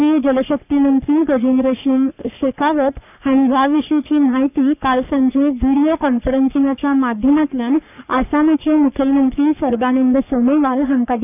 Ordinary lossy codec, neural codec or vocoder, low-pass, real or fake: none; codec, 44.1 kHz, 3.4 kbps, Pupu-Codec; 3.6 kHz; fake